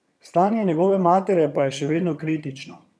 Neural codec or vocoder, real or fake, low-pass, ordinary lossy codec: vocoder, 22.05 kHz, 80 mel bands, HiFi-GAN; fake; none; none